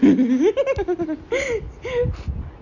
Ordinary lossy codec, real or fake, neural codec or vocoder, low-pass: none; real; none; 7.2 kHz